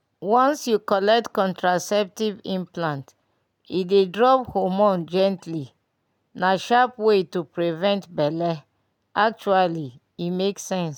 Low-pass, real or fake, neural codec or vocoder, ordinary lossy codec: 19.8 kHz; real; none; none